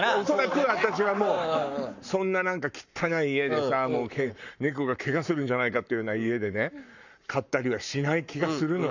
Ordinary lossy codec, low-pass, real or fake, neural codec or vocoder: none; 7.2 kHz; fake; codec, 44.1 kHz, 7.8 kbps, Pupu-Codec